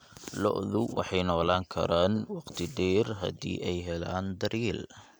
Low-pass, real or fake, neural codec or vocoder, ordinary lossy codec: none; real; none; none